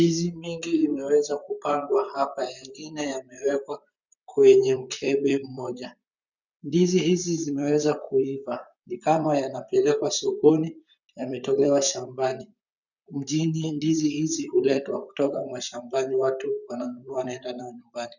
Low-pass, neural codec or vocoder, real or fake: 7.2 kHz; vocoder, 44.1 kHz, 128 mel bands, Pupu-Vocoder; fake